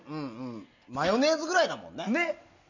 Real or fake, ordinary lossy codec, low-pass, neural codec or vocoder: real; none; 7.2 kHz; none